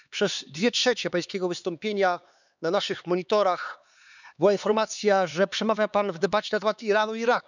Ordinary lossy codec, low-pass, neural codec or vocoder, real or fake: none; 7.2 kHz; codec, 16 kHz, 4 kbps, X-Codec, HuBERT features, trained on LibriSpeech; fake